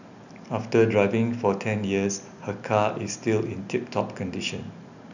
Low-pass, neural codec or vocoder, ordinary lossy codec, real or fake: 7.2 kHz; none; none; real